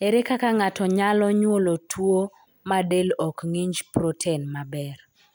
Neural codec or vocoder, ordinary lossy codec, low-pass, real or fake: none; none; none; real